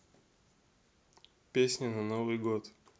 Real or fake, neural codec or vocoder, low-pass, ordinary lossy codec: real; none; none; none